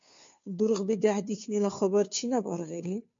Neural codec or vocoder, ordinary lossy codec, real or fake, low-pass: codec, 16 kHz, 2 kbps, FunCodec, trained on Chinese and English, 25 frames a second; MP3, 48 kbps; fake; 7.2 kHz